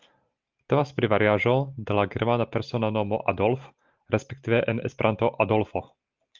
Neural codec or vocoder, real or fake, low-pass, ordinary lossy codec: none; real; 7.2 kHz; Opus, 32 kbps